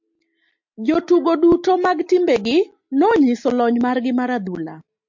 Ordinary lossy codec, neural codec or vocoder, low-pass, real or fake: MP3, 48 kbps; none; 7.2 kHz; real